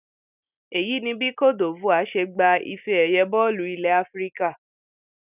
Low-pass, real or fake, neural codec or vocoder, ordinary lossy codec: 3.6 kHz; real; none; none